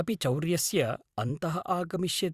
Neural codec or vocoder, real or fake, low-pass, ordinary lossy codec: vocoder, 44.1 kHz, 128 mel bands every 512 samples, BigVGAN v2; fake; 14.4 kHz; Opus, 64 kbps